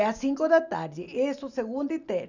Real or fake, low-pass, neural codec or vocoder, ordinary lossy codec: real; 7.2 kHz; none; none